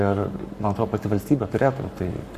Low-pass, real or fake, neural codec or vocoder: 14.4 kHz; fake; codec, 44.1 kHz, 7.8 kbps, Pupu-Codec